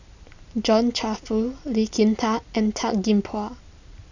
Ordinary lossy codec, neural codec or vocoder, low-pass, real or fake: none; none; 7.2 kHz; real